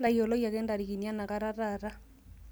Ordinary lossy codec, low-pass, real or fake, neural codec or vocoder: none; none; real; none